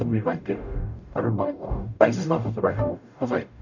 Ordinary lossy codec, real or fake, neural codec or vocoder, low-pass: none; fake; codec, 44.1 kHz, 0.9 kbps, DAC; 7.2 kHz